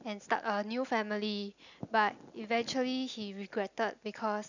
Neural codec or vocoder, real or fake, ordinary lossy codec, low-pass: none; real; none; 7.2 kHz